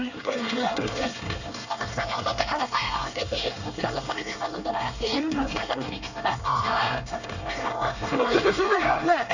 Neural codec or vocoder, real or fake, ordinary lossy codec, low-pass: codec, 24 kHz, 1 kbps, SNAC; fake; none; 7.2 kHz